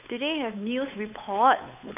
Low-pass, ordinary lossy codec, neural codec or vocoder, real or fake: 3.6 kHz; none; codec, 16 kHz, 8 kbps, FunCodec, trained on Chinese and English, 25 frames a second; fake